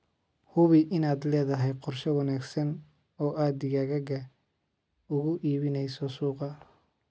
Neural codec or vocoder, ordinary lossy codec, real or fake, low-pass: none; none; real; none